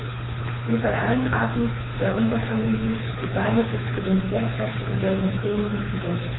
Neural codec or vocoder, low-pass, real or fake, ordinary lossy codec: codec, 24 kHz, 3 kbps, HILCodec; 7.2 kHz; fake; AAC, 16 kbps